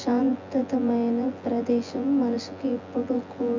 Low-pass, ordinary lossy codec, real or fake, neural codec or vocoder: 7.2 kHz; MP3, 48 kbps; fake; vocoder, 24 kHz, 100 mel bands, Vocos